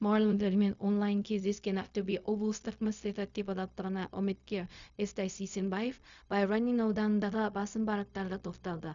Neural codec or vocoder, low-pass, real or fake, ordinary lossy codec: codec, 16 kHz, 0.4 kbps, LongCat-Audio-Codec; 7.2 kHz; fake; none